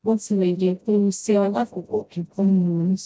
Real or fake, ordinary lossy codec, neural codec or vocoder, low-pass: fake; none; codec, 16 kHz, 0.5 kbps, FreqCodec, smaller model; none